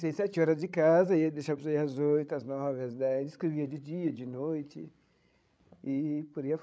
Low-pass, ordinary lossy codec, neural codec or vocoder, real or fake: none; none; codec, 16 kHz, 16 kbps, FreqCodec, larger model; fake